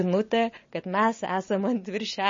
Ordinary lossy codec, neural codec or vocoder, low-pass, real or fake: MP3, 32 kbps; none; 7.2 kHz; real